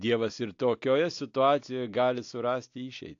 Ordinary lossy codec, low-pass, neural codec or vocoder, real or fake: AAC, 48 kbps; 7.2 kHz; none; real